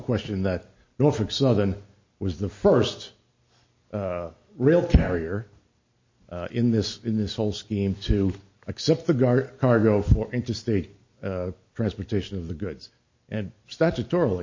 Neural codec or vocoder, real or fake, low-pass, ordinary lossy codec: none; real; 7.2 kHz; MP3, 32 kbps